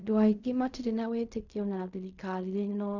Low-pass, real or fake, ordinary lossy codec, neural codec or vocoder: 7.2 kHz; fake; none; codec, 16 kHz in and 24 kHz out, 0.4 kbps, LongCat-Audio-Codec, fine tuned four codebook decoder